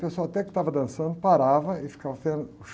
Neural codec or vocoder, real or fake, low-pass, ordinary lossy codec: none; real; none; none